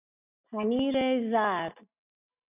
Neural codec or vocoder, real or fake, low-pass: none; real; 3.6 kHz